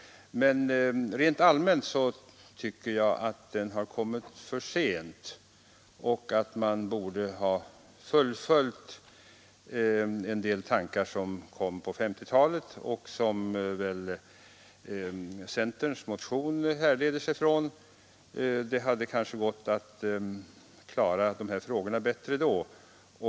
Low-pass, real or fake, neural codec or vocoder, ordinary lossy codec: none; real; none; none